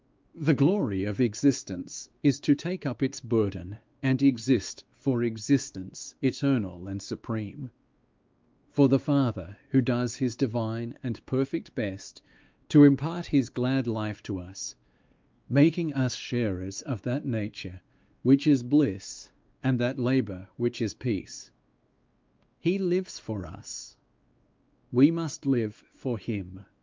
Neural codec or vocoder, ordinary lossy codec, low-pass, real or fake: codec, 16 kHz, 2 kbps, X-Codec, WavLM features, trained on Multilingual LibriSpeech; Opus, 24 kbps; 7.2 kHz; fake